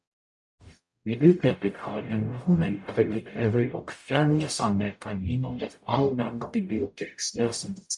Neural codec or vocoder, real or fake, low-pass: codec, 44.1 kHz, 0.9 kbps, DAC; fake; 10.8 kHz